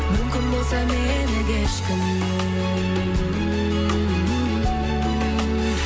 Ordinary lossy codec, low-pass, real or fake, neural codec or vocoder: none; none; real; none